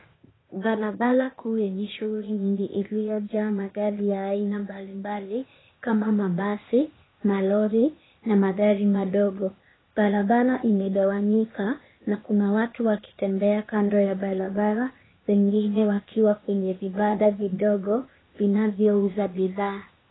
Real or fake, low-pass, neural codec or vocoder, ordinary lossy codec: fake; 7.2 kHz; codec, 16 kHz, 0.8 kbps, ZipCodec; AAC, 16 kbps